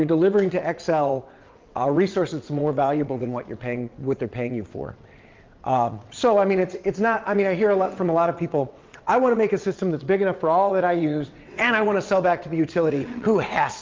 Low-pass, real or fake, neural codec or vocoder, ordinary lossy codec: 7.2 kHz; fake; vocoder, 22.05 kHz, 80 mel bands, WaveNeXt; Opus, 24 kbps